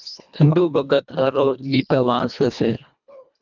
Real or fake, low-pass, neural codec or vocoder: fake; 7.2 kHz; codec, 24 kHz, 1.5 kbps, HILCodec